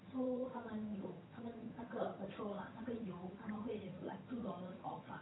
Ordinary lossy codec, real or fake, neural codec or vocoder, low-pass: AAC, 16 kbps; fake; vocoder, 22.05 kHz, 80 mel bands, HiFi-GAN; 7.2 kHz